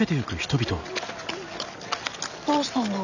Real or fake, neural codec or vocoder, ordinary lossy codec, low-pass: real; none; none; 7.2 kHz